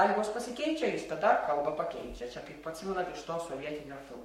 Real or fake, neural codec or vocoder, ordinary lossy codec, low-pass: fake; codec, 44.1 kHz, 7.8 kbps, Pupu-Codec; MP3, 64 kbps; 19.8 kHz